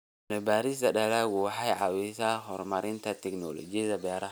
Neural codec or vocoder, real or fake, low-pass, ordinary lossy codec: none; real; none; none